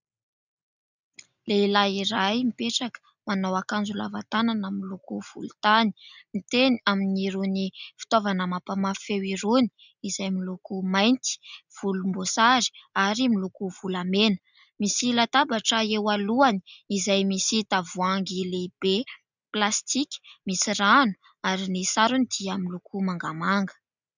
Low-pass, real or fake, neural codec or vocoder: 7.2 kHz; real; none